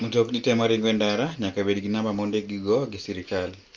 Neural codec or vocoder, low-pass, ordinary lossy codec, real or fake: none; 7.2 kHz; Opus, 24 kbps; real